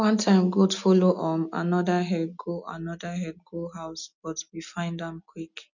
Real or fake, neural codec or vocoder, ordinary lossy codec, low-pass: real; none; none; 7.2 kHz